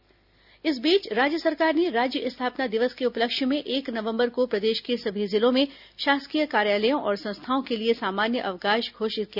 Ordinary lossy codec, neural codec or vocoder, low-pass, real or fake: none; none; 5.4 kHz; real